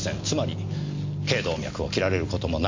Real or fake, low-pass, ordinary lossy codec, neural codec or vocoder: real; 7.2 kHz; MP3, 48 kbps; none